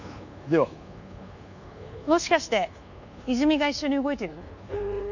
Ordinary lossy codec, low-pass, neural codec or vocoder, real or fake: none; 7.2 kHz; codec, 24 kHz, 1.2 kbps, DualCodec; fake